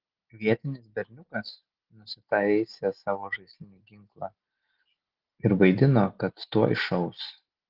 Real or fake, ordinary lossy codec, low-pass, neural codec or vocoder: real; Opus, 16 kbps; 5.4 kHz; none